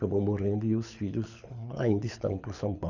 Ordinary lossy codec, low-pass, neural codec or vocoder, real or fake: none; 7.2 kHz; codec, 24 kHz, 6 kbps, HILCodec; fake